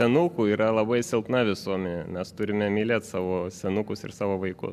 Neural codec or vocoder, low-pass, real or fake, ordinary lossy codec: none; 14.4 kHz; real; MP3, 96 kbps